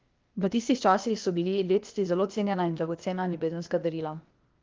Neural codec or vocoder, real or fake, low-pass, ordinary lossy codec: codec, 16 kHz, 0.8 kbps, ZipCodec; fake; 7.2 kHz; Opus, 32 kbps